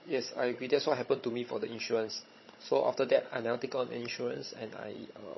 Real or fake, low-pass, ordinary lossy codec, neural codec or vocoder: fake; 7.2 kHz; MP3, 24 kbps; codec, 16 kHz, 16 kbps, FunCodec, trained on Chinese and English, 50 frames a second